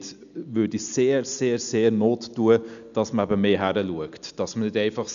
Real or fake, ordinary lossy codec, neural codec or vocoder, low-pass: real; none; none; 7.2 kHz